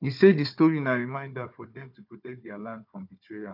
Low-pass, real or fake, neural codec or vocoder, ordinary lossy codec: 5.4 kHz; fake; codec, 16 kHz, 4 kbps, FunCodec, trained on Chinese and English, 50 frames a second; none